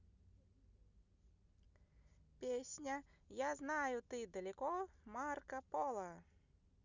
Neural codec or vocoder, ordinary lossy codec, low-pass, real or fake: none; none; 7.2 kHz; real